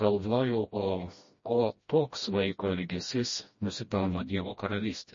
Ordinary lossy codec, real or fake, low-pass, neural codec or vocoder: MP3, 32 kbps; fake; 7.2 kHz; codec, 16 kHz, 1 kbps, FreqCodec, smaller model